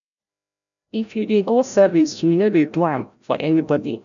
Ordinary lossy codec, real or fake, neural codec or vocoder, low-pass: none; fake; codec, 16 kHz, 0.5 kbps, FreqCodec, larger model; 7.2 kHz